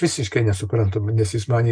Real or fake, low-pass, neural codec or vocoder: real; 9.9 kHz; none